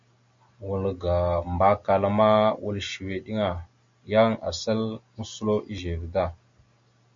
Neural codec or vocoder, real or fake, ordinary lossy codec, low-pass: none; real; MP3, 48 kbps; 7.2 kHz